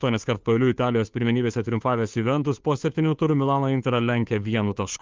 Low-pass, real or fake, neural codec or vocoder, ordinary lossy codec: 7.2 kHz; fake; codec, 16 kHz, 2 kbps, FunCodec, trained on Chinese and English, 25 frames a second; Opus, 32 kbps